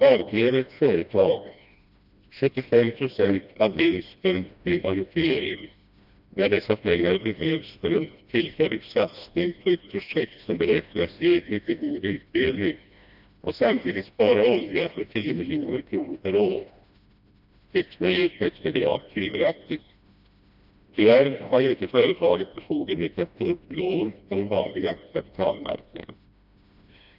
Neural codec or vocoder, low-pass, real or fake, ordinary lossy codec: codec, 16 kHz, 1 kbps, FreqCodec, smaller model; 5.4 kHz; fake; none